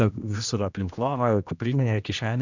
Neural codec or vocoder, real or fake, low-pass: codec, 16 kHz, 1 kbps, X-Codec, HuBERT features, trained on general audio; fake; 7.2 kHz